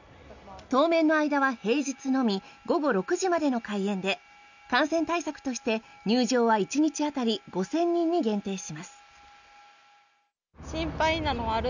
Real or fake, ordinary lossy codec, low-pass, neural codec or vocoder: real; none; 7.2 kHz; none